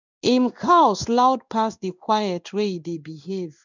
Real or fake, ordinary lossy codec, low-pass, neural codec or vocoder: fake; none; 7.2 kHz; codec, 16 kHz in and 24 kHz out, 1 kbps, XY-Tokenizer